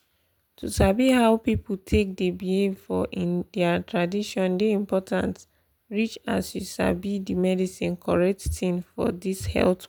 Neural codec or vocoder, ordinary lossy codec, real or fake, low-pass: none; none; real; none